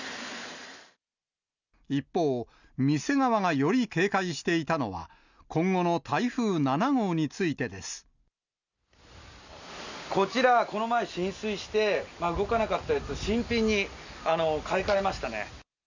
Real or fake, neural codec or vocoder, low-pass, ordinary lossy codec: real; none; 7.2 kHz; none